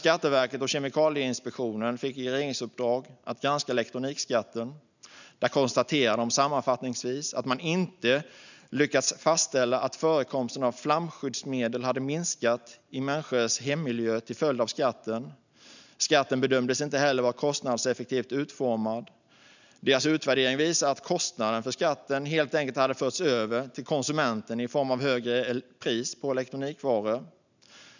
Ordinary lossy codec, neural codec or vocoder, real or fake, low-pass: none; none; real; 7.2 kHz